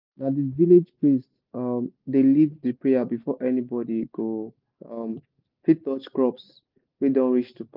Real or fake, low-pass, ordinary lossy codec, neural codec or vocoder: real; 5.4 kHz; Opus, 24 kbps; none